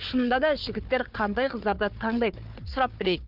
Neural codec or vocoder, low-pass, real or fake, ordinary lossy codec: codec, 16 kHz, 4 kbps, FunCodec, trained on Chinese and English, 50 frames a second; 5.4 kHz; fake; Opus, 24 kbps